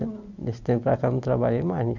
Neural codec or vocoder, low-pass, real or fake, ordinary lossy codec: none; 7.2 kHz; real; none